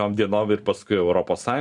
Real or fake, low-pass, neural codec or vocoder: real; 10.8 kHz; none